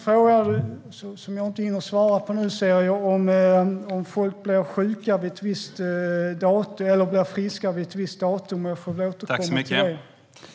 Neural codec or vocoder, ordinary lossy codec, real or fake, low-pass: none; none; real; none